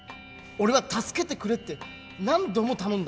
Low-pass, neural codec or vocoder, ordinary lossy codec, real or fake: none; none; none; real